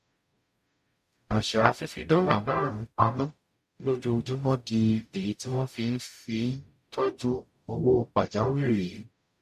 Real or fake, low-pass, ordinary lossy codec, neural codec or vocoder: fake; 14.4 kHz; MP3, 64 kbps; codec, 44.1 kHz, 0.9 kbps, DAC